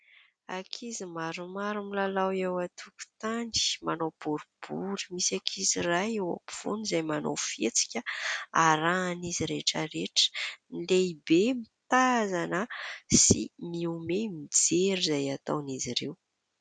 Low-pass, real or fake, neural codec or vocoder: 9.9 kHz; real; none